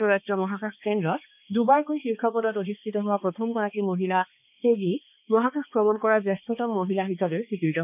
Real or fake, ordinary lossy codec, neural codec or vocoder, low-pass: fake; none; codec, 16 kHz, 2 kbps, X-Codec, HuBERT features, trained on balanced general audio; 3.6 kHz